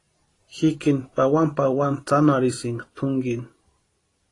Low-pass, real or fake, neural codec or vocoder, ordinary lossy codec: 10.8 kHz; fake; vocoder, 44.1 kHz, 128 mel bands every 512 samples, BigVGAN v2; AAC, 32 kbps